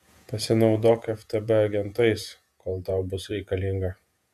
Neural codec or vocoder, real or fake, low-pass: vocoder, 48 kHz, 128 mel bands, Vocos; fake; 14.4 kHz